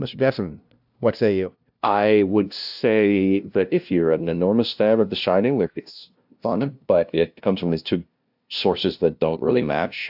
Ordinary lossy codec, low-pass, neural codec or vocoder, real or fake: AAC, 48 kbps; 5.4 kHz; codec, 16 kHz, 0.5 kbps, FunCodec, trained on LibriTTS, 25 frames a second; fake